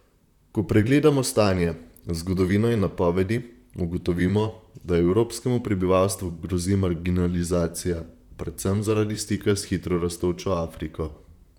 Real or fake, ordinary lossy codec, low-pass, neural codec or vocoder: fake; none; 19.8 kHz; vocoder, 44.1 kHz, 128 mel bands, Pupu-Vocoder